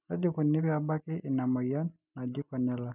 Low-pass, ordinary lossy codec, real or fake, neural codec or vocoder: 3.6 kHz; none; real; none